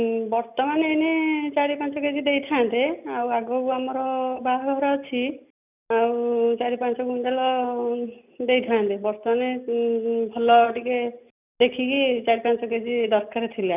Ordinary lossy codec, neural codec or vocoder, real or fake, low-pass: none; none; real; 3.6 kHz